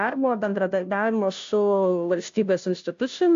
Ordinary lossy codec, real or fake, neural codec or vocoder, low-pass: AAC, 64 kbps; fake; codec, 16 kHz, 0.5 kbps, FunCodec, trained on Chinese and English, 25 frames a second; 7.2 kHz